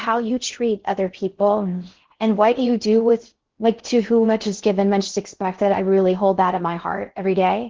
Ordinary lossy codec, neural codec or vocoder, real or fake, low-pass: Opus, 16 kbps; codec, 16 kHz in and 24 kHz out, 0.6 kbps, FocalCodec, streaming, 4096 codes; fake; 7.2 kHz